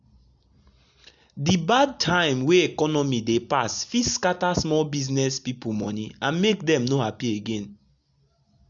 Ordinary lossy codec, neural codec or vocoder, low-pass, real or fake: none; none; 7.2 kHz; real